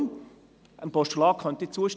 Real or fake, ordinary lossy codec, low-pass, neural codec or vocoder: real; none; none; none